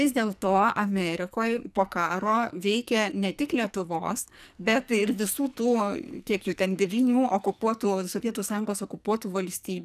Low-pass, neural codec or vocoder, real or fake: 14.4 kHz; codec, 44.1 kHz, 2.6 kbps, SNAC; fake